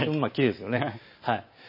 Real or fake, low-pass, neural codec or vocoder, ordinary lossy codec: real; 5.4 kHz; none; MP3, 24 kbps